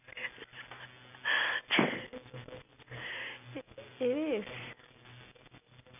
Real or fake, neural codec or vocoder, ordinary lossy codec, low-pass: real; none; none; 3.6 kHz